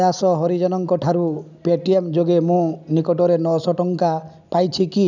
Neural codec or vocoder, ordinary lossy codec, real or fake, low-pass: none; none; real; 7.2 kHz